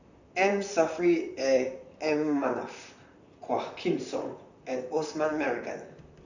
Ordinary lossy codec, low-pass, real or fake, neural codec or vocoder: none; 7.2 kHz; fake; vocoder, 44.1 kHz, 128 mel bands, Pupu-Vocoder